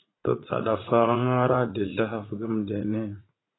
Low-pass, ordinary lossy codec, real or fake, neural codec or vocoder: 7.2 kHz; AAC, 16 kbps; fake; vocoder, 44.1 kHz, 80 mel bands, Vocos